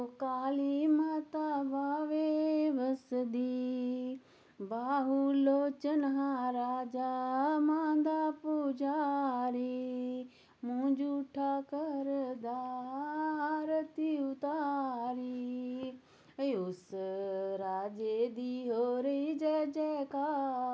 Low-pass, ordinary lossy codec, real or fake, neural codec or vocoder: none; none; real; none